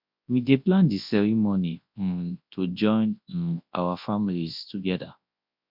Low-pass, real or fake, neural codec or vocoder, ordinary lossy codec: 5.4 kHz; fake; codec, 24 kHz, 0.9 kbps, WavTokenizer, large speech release; MP3, 48 kbps